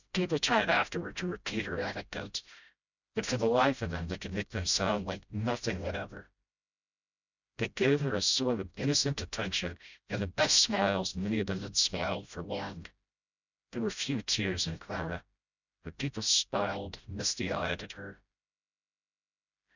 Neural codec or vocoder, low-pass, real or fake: codec, 16 kHz, 0.5 kbps, FreqCodec, smaller model; 7.2 kHz; fake